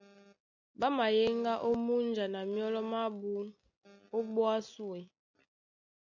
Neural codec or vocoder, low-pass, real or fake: none; 7.2 kHz; real